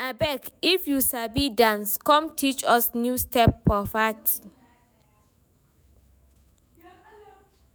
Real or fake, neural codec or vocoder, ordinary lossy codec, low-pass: fake; autoencoder, 48 kHz, 128 numbers a frame, DAC-VAE, trained on Japanese speech; none; none